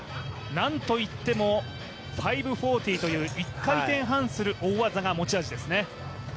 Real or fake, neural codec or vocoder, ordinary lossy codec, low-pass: real; none; none; none